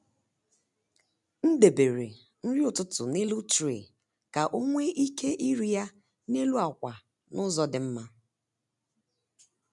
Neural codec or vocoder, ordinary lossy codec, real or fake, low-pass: none; none; real; 10.8 kHz